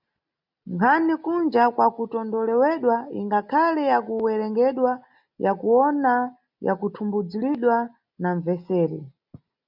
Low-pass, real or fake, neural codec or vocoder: 5.4 kHz; real; none